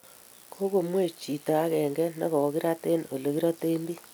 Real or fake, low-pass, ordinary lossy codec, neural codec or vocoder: real; none; none; none